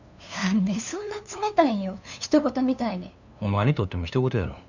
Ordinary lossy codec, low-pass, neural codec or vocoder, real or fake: none; 7.2 kHz; codec, 16 kHz, 2 kbps, FunCodec, trained on LibriTTS, 25 frames a second; fake